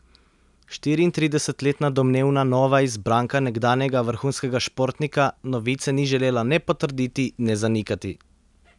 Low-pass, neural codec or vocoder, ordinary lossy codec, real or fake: 10.8 kHz; none; none; real